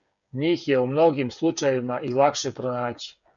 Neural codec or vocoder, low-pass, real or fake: codec, 16 kHz, 8 kbps, FreqCodec, smaller model; 7.2 kHz; fake